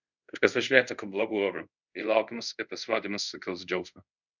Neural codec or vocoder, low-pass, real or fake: codec, 24 kHz, 0.5 kbps, DualCodec; 7.2 kHz; fake